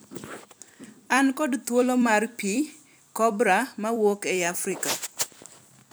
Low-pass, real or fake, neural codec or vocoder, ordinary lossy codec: none; fake; vocoder, 44.1 kHz, 128 mel bands every 256 samples, BigVGAN v2; none